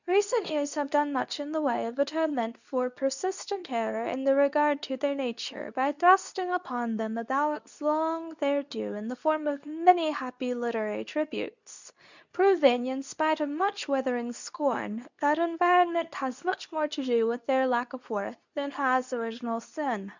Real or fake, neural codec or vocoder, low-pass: fake; codec, 24 kHz, 0.9 kbps, WavTokenizer, medium speech release version 2; 7.2 kHz